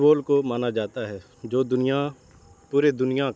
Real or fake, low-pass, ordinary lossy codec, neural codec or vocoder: real; none; none; none